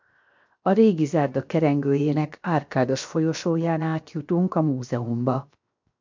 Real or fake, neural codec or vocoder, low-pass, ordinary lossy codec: fake; codec, 16 kHz, 0.7 kbps, FocalCodec; 7.2 kHz; MP3, 64 kbps